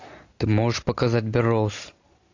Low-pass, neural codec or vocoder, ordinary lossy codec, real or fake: 7.2 kHz; none; AAC, 48 kbps; real